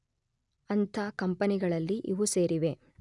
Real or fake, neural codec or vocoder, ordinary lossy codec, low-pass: real; none; none; 10.8 kHz